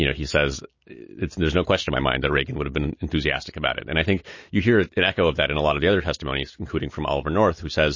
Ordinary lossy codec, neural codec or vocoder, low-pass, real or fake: MP3, 32 kbps; none; 7.2 kHz; real